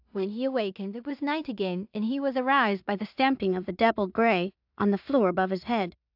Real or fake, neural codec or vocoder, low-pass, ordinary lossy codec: fake; codec, 16 kHz in and 24 kHz out, 0.4 kbps, LongCat-Audio-Codec, two codebook decoder; 5.4 kHz; AAC, 48 kbps